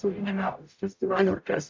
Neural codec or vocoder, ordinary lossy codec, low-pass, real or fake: codec, 44.1 kHz, 0.9 kbps, DAC; MP3, 64 kbps; 7.2 kHz; fake